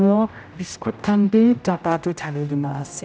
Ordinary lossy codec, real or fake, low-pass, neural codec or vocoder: none; fake; none; codec, 16 kHz, 0.5 kbps, X-Codec, HuBERT features, trained on general audio